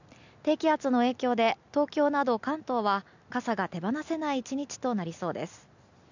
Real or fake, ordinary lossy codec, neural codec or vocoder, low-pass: real; none; none; 7.2 kHz